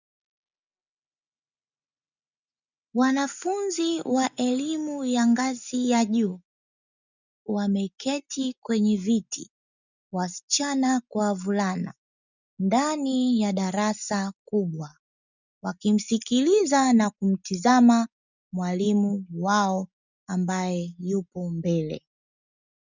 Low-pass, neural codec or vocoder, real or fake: 7.2 kHz; none; real